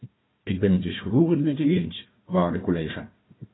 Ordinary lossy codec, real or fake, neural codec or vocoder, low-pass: AAC, 16 kbps; fake; codec, 16 kHz, 1 kbps, FunCodec, trained on Chinese and English, 50 frames a second; 7.2 kHz